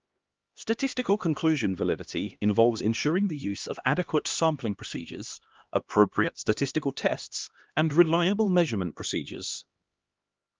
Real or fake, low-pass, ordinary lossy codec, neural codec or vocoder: fake; 7.2 kHz; Opus, 24 kbps; codec, 16 kHz, 1 kbps, X-Codec, HuBERT features, trained on LibriSpeech